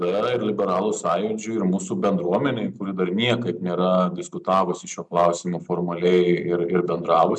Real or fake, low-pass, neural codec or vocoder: real; 10.8 kHz; none